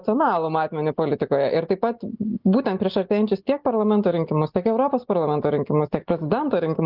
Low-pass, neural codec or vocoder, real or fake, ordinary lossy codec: 5.4 kHz; none; real; Opus, 32 kbps